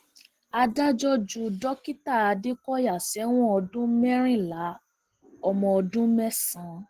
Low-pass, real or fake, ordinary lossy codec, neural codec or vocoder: 14.4 kHz; real; Opus, 16 kbps; none